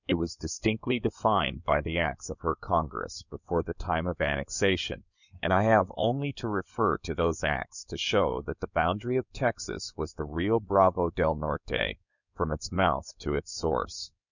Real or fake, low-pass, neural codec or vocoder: fake; 7.2 kHz; codec, 16 kHz in and 24 kHz out, 2.2 kbps, FireRedTTS-2 codec